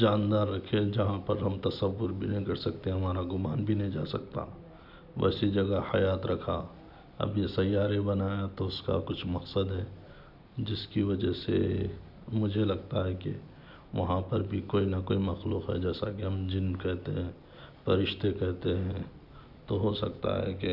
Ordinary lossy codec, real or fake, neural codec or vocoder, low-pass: none; real; none; 5.4 kHz